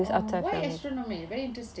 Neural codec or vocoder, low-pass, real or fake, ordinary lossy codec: none; none; real; none